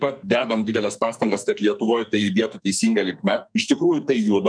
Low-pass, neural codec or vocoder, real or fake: 9.9 kHz; codec, 44.1 kHz, 2.6 kbps, SNAC; fake